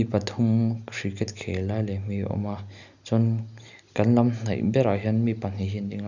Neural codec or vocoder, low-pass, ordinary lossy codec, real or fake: none; 7.2 kHz; none; real